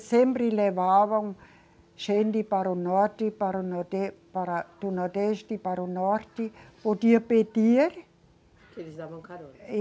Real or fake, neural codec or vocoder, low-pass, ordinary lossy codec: real; none; none; none